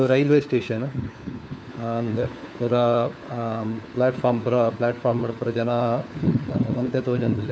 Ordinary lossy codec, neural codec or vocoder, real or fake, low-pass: none; codec, 16 kHz, 4 kbps, FunCodec, trained on LibriTTS, 50 frames a second; fake; none